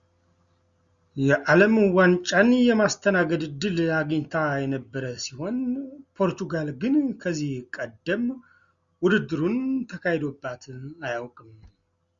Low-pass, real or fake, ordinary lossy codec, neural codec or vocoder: 7.2 kHz; real; Opus, 64 kbps; none